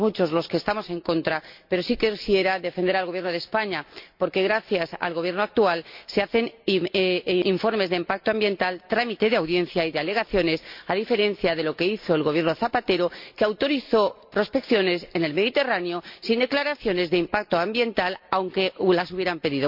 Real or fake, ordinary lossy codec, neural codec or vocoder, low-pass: real; MP3, 48 kbps; none; 5.4 kHz